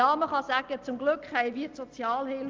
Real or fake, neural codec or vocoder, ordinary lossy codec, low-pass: real; none; Opus, 32 kbps; 7.2 kHz